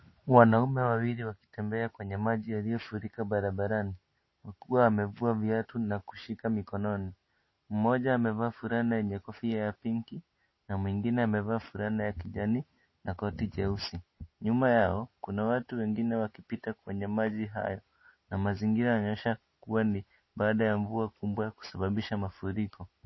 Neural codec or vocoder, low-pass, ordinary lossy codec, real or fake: none; 7.2 kHz; MP3, 24 kbps; real